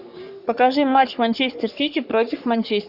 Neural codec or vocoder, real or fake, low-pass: codec, 44.1 kHz, 3.4 kbps, Pupu-Codec; fake; 5.4 kHz